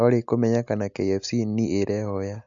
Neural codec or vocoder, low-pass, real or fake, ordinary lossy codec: none; 7.2 kHz; real; none